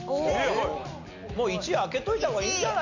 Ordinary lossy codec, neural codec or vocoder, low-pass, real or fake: none; none; 7.2 kHz; real